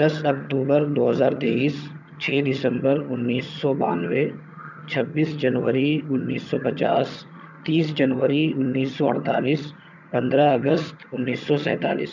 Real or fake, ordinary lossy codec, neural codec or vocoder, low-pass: fake; none; vocoder, 22.05 kHz, 80 mel bands, HiFi-GAN; 7.2 kHz